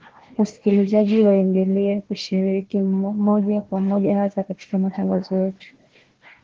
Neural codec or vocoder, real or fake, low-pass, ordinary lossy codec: codec, 16 kHz, 1 kbps, FunCodec, trained on Chinese and English, 50 frames a second; fake; 7.2 kHz; Opus, 16 kbps